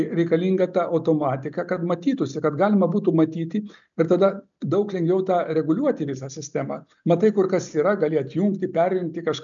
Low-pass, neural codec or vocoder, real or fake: 7.2 kHz; none; real